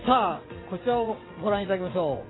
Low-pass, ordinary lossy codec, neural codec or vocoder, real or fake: 7.2 kHz; AAC, 16 kbps; vocoder, 22.05 kHz, 80 mel bands, WaveNeXt; fake